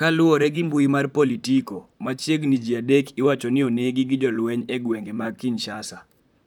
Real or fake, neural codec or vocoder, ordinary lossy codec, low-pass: fake; vocoder, 44.1 kHz, 128 mel bands, Pupu-Vocoder; none; 19.8 kHz